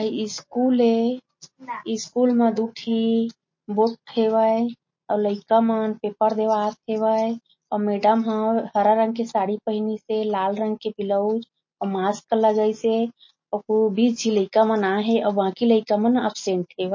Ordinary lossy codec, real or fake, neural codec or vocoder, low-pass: MP3, 32 kbps; real; none; 7.2 kHz